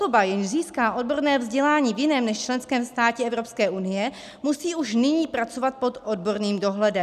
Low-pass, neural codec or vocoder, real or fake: 14.4 kHz; none; real